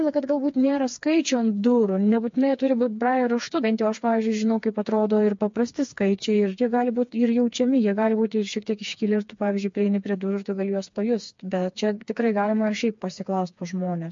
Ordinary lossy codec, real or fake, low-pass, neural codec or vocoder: MP3, 48 kbps; fake; 7.2 kHz; codec, 16 kHz, 4 kbps, FreqCodec, smaller model